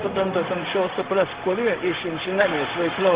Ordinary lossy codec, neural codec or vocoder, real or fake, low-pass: Opus, 16 kbps; codec, 16 kHz, 0.4 kbps, LongCat-Audio-Codec; fake; 3.6 kHz